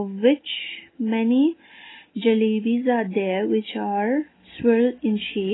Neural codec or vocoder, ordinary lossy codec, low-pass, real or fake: none; AAC, 16 kbps; 7.2 kHz; real